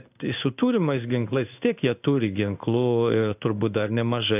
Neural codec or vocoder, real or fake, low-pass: codec, 16 kHz in and 24 kHz out, 1 kbps, XY-Tokenizer; fake; 3.6 kHz